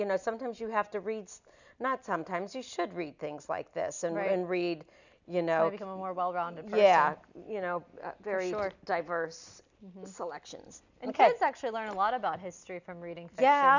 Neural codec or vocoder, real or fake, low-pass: none; real; 7.2 kHz